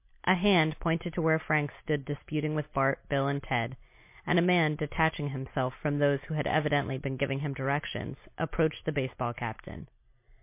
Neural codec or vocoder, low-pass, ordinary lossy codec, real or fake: none; 3.6 kHz; MP3, 24 kbps; real